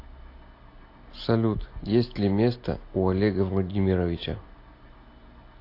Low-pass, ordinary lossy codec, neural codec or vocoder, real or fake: 5.4 kHz; AAC, 32 kbps; none; real